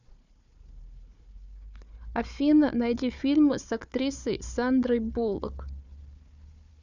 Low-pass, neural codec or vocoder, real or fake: 7.2 kHz; codec, 16 kHz, 4 kbps, FunCodec, trained on Chinese and English, 50 frames a second; fake